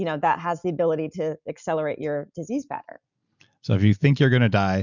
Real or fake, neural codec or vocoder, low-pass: real; none; 7.2 kHz